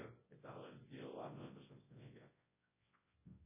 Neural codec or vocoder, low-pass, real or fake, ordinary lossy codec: codec, 24 kHz, 0.9 kbps, WavTokenizer, large speech release; 3.6 kHz; fake; MP3, 16 kbps